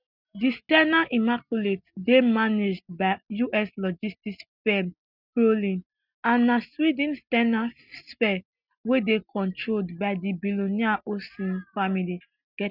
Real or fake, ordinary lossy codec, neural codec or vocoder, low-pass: real; none; none; 5.4 kHz